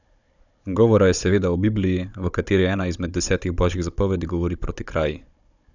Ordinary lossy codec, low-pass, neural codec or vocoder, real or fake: none; 7.2 kHz; codec, 16 kHz, 16 kbps, FunCodec, trained on Chinese and English, 50 frames a second; fake